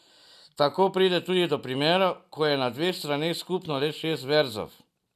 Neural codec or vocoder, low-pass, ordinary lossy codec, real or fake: none; 14.4 kHz; none; real